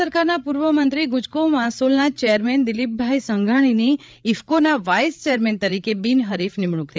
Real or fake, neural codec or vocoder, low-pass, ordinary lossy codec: fake; codec, 16 kHz, 8 kbps, FreqCodec, larger model; none; none